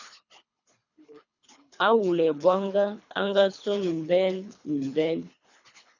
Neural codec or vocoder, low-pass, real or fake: codec, 24 kHz, 3 kbps, HILCodec; 7.2 kHz; fake